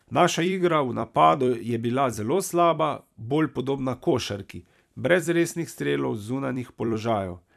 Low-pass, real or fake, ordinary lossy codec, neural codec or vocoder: 14.4 kHz; fake; none; vocoder, 44.1 kHz, 128 mel bands every 256 samples, BigVGAN v2